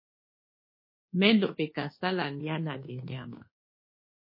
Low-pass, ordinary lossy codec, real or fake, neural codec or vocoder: 5.4 kHz; MP3, 24 kbps; fake; codec, 24 kHz, 1.2 kbps, DualCodec